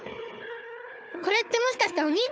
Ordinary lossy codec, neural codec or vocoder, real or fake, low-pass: none; codec, 16 kHz, 4 kbps, FunCodec, trained on Chinese and English, 50 frames a second; fake; none